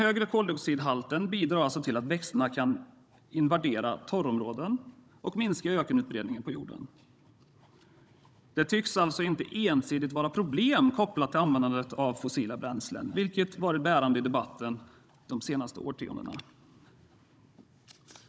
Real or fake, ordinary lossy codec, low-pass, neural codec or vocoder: fake; none; none; codec, 16 kHz, 16 kbps, FunCodec, trained on Chinese and English, 50 frames a second